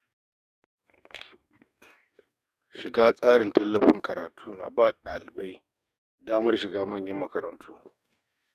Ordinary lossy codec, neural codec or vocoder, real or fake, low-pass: none; codec, 44.1 kHz, 2.6 kbps, DAC; fake; 14.4 kHz